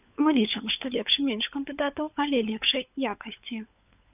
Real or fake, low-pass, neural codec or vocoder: fake; 3.6 kHz; codec, 16 kHz, 16 kbps, FunCodec, trained on LibriTTS, 50 frames a second